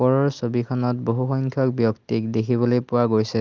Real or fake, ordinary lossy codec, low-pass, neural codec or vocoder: real; Opus, 24 kbps; 7.2 kHz; none